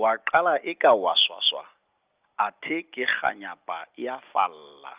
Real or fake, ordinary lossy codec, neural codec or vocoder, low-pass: real; Opus, 24 kbps; none; 3.6 kHz